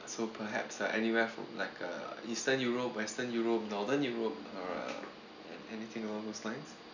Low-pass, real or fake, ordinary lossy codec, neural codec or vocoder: 7.2 kHz; real; none; none